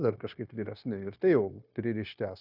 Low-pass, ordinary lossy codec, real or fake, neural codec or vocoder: 5.4 kHz; Opus, 64 kbps; fake; codec, 16 kHz, 0.9 kbps, LongCat-Audio-Codec